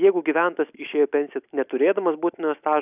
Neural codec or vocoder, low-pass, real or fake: none; 3.6 kHz; real